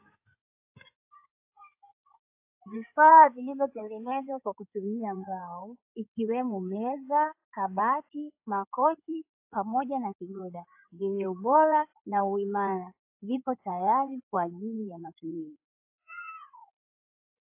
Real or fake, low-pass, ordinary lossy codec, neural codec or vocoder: fake; 3.6 kHz; AAC, 32 kbps; codec, 16 kHz in and 24 kHz out, 2.2 kbps, FireRedTTS-2 codec